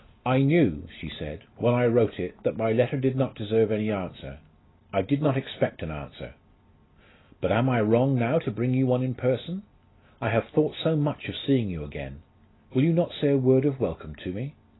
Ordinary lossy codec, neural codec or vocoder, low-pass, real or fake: AAC, 16 kbps; none; 7.2 kHz; real